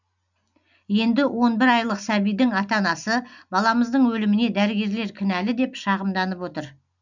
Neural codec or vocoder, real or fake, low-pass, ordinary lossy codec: none; real; 7.2 kHz; none